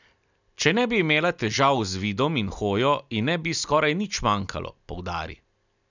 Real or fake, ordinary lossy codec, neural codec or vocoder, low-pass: real; none; none; 7.2 kHz